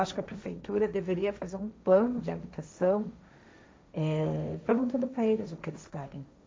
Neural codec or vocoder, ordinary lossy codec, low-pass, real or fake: codec, 16 kHz, 1.1 kbps, Voila-Tokenizer; none; none; fake